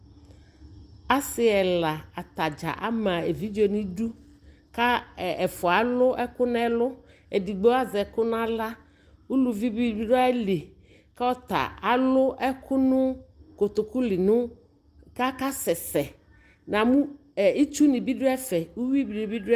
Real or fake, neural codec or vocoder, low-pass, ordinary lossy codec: real; none; 14.4 kHz; Opus, 32 kbps